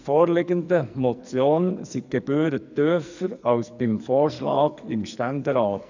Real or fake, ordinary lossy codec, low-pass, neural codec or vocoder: fake; none; 7.2 kHz; codec, 44.1 kHz, 2.6 kbps, SNAC